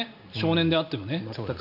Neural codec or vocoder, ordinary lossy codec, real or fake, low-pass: none; none; real; 5.4 kHz